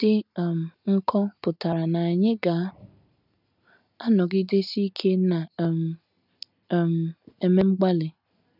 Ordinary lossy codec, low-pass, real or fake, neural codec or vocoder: none; 5.4 kHz; real; none